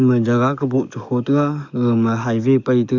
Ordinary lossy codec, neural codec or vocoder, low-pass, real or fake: none; codec, 44.1 kHz, 7.8 kbps, DAC; 7.2 kHz; fake